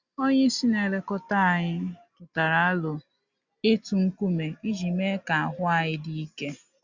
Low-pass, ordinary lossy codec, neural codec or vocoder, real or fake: 7.2 kHz; Opus, 64 kbps; none; real